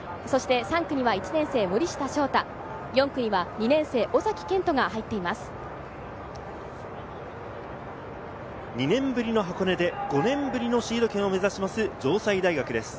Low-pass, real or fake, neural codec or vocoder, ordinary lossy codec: none; real; none; none